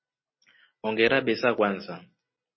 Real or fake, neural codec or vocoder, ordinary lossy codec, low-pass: real; none; MP3, 24 kbps; 7.2 kHz